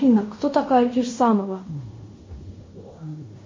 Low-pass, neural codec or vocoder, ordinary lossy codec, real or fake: 7.2 kHz; codec, 16 kHz in and 24 kHz out, 0.9 kbps, LongCat-Audio-Codec, fine tuned four codebook decoder; MP3, 32 kbps; fake